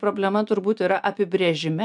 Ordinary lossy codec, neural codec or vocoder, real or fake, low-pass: MP3, 96 kbps; none; real; 10.8 kHz